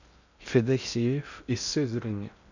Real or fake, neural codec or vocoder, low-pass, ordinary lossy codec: fake; codec, 16 kHz in and 24 kHz out, 0.6 kbps, FocalCodec, streaming, 2048 codes; 7.2 kHz; none